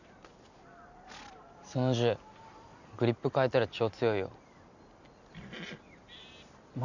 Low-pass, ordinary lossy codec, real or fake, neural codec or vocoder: 7.2 kHz; none; real; none